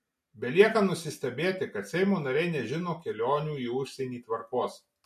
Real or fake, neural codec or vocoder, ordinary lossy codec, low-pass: real; none; MP3, 64 kbps; 14.4 kHz